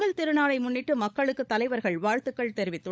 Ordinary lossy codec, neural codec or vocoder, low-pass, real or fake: none; codec, 16 kHz, 16 kbps, FunCodec, trained on LibriTTS, 50 frames a second; none; fake